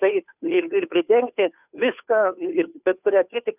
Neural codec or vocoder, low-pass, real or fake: codec, 16 kHz, 2 kbps, FunCodec, trained on Chinese and English, 25 frames a second; 3.6 kHz; fake